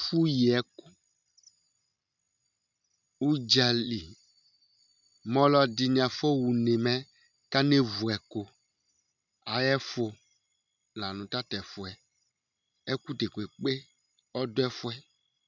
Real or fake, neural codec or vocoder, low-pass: real; none; 7.2 kHz